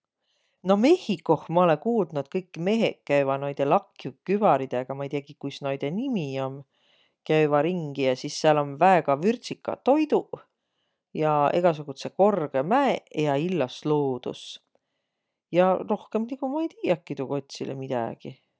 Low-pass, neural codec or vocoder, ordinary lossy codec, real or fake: none; none; none; real